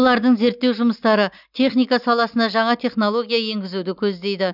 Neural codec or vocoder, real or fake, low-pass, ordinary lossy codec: none; real; 5.4 kHz; none